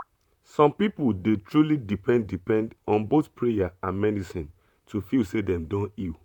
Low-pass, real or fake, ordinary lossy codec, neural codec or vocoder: 19.8 kHz; fake; none; vocoder, 44.1 kHz, 128 mel bands, Pupu-Vocoder